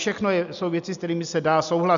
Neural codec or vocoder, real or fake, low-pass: none; real; 7.2 kHz